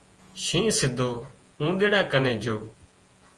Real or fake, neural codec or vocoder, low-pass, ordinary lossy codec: fake; vocoder, 48 kHz, 128 mel bands, Vocos; 10.8 kHz; Opus, 24 kbps